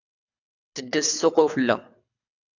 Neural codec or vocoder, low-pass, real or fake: codec, 24 kHz, 3 kbps, HILCodec; 7.2 kHz; fake